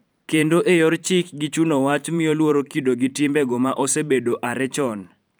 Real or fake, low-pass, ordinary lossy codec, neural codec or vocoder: fake; none; none; vocoder, 44.1 kHz, 128 mel bands every 512 samples, BigVGAN v2